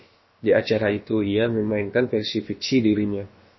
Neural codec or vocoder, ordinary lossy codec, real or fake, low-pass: codec, 16 kHz, about 1 kbps, DyCAST, with the encoder's durations; MP3, 24 kbps; fake; 7.2 kHz